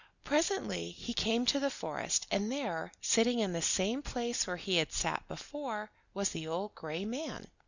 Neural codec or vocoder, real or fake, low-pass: none; real; 7.2 kHz